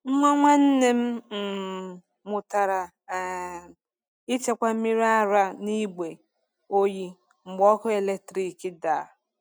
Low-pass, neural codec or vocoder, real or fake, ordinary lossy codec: 19.8 kHz; none; real; none